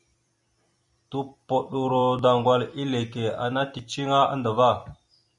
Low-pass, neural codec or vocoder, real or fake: 10.8 kHz; vocoder, 44.1 kHz, 128 mel bands every 256 samples, BigVGAN v2; fake